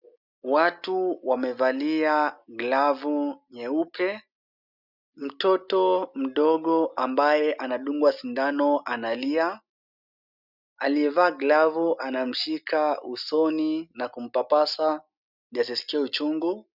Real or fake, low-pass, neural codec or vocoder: real; 5.4 kHz; none